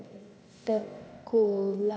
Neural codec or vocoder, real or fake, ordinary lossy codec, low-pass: codec, 16 kHz, 0.8 kbps, ZipCodec; fake; none; none